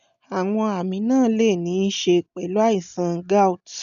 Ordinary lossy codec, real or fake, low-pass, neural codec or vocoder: none; real; 7.2 kHz; none